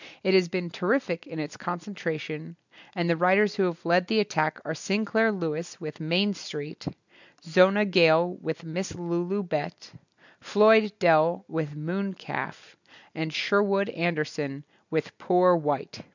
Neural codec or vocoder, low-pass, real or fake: none; 7.2 kHz; real